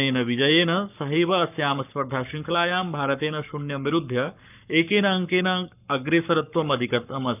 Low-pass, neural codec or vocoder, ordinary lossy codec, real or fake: 3.6 kHz; codec, 44.1 kHz, 7.8 kbps, Pupu-Codec; none; fake